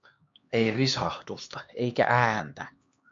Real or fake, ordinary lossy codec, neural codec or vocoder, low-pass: fake; MP3, 48 kbps; codec, 16 kHz, 2 kbps, X-Codec, HuBERT features, trained on LibriSpeech; 7.2 kHz